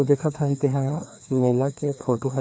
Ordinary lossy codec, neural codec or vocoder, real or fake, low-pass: none; codec, 16 kHz, 2 kbps, FreqCodec, larger model; fake; none